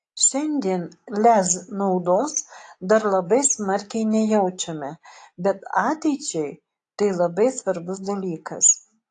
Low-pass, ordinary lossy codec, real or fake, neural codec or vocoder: 10.8 kHz; AAC, 48 kbps; real; none